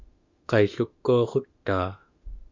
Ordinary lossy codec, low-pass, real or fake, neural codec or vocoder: Opus, 64 kbps; 7.2 kHz; fake; autoencoder, 48 kHz, 32 numbers a frame, DAC-VAE, trained on Japanese speech